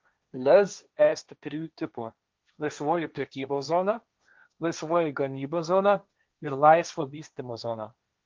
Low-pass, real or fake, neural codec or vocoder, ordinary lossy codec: 7.2 kHz; fake; codec, 16 kHz, 1.1 kbps, Voila-Tokenizer; Opus, 24 kbps